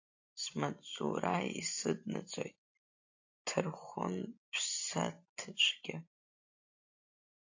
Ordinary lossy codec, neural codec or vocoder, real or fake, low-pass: AAC, 48 kbps; none; real; 7.2 kHz